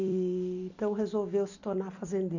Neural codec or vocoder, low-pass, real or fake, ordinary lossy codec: vocoder, 44.1 kHz, 128 mel bands every 256 samples, BigVGAN v2; 7.2 kHz; fake; none